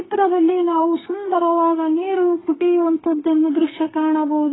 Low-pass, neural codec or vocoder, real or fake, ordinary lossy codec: 7.2 kHz; codec, 32 kHz, 1.9 kbps, SNAC; fake; AAC, 16 kbps